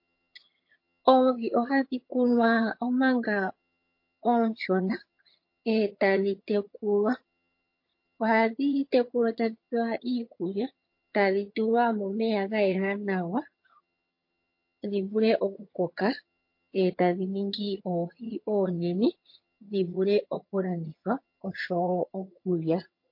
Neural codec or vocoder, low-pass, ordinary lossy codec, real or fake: vocoder, 22.05 kHz, 80 mel bands, HiFi-GAN; 5.4 kHz; MP3, 32 kbps; fake